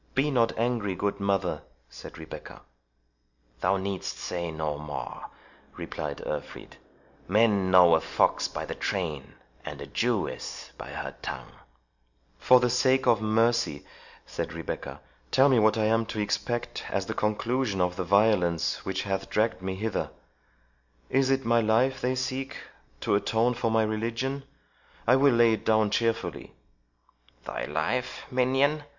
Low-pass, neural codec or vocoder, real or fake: 7.2 kHz; none; real